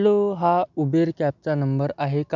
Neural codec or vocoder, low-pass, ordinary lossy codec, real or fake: none; 7.2 kHz; none; real